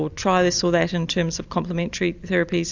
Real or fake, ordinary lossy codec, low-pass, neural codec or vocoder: fake; Opus, 64 kbps; 7.2 kHz; vocoder, 44.1 kHz, 128 mel bands every 256 samples, BigVGAN v2